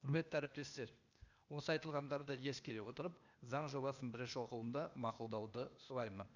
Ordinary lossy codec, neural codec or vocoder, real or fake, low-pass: none; codec, 16 kHz, 0.8 kbps, ZipCodec; fake; 7.2 kHz